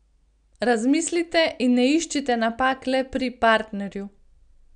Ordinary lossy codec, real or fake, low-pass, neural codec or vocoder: none; real; 9.9 kHz; none